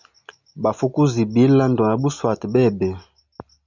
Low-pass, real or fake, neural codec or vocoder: 7.2 kHz; real; none